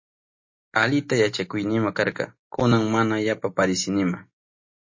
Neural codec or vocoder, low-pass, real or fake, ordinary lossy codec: none; 7.2 kHz; real; MP3, 32 kbps